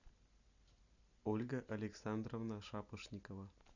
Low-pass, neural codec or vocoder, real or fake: 7.2 kHz; none; real